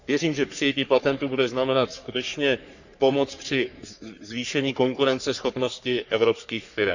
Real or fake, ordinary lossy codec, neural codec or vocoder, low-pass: fake; none; codec, 44.1 kHz, 3.4 kbps, Pupu-Codec; 7.2 kHz